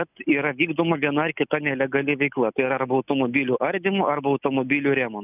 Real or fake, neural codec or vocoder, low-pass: real; none; 3.6 kHz